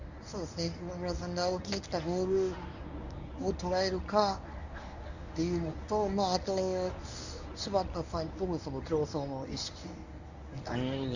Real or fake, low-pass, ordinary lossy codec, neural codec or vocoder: fake; 7.2 kHz; none; codec, 24 kHz, 0.9 kbps, WavTokenizer, medium speech release version 1